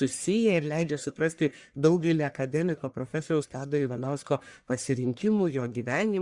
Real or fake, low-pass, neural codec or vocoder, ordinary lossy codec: fake; 10.8 kHz; codec, 44.1 kHz, 1.7 kbps, Pupu-Codec; Opus, 64 kbps